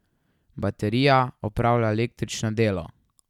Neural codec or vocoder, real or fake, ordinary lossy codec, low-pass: none; real; none; 19.8 kHz